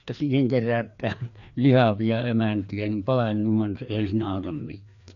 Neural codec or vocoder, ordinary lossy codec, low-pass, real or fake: codec, 16 kHz, 2 kbps, FreqCodec, larger model; none; 7.2 kHz; fake